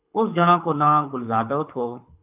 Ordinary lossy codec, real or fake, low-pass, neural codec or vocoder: AAC, 32 kbps; fake; 3.6 kHz; codec, 24 kHz, 6 kbps, HILCodec